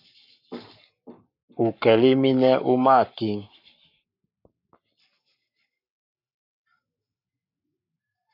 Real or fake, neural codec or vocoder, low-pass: fake; codec, 44.1 kHz, 7.8 kbps, Pupu-Codec; 5.4 kHz